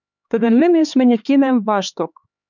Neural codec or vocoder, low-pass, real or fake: codec, 16 kHz, 4 kbps, X-Codec, HuBERT features, trained on LibriSpeech; 7.2 kHz; fake